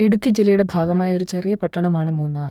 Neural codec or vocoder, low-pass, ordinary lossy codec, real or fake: codec, 44.1 kHz, 2.6 kbps, DAC; 19.8 kHz; none; fake